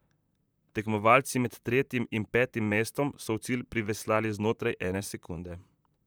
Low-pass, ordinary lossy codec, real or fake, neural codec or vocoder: none; none; fake; vocoder, 44.1 kHz, 128 mel bands, Pupu-Vocoder